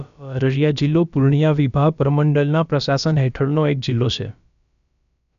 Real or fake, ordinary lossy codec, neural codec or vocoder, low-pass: fake; none; codec, 16 kHz, about 1 kbps, DyCAST, with the encoder's durations; 7.2 kHz